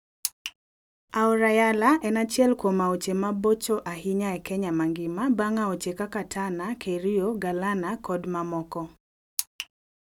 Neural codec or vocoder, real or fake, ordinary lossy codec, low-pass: none; real; none; 19.8 kHz